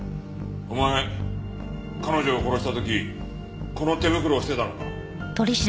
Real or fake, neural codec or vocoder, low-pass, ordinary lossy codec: real; none; none; none